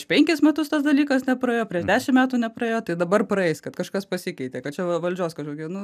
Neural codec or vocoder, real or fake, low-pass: none; real; 14.4 kHz